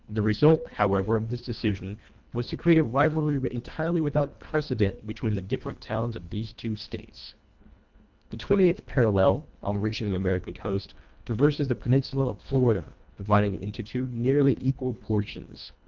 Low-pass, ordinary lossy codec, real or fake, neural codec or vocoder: 7.2 kHz; Opus, 24 kbps; fake; codec, 24 kHz, 1.5 kbps, HILCodec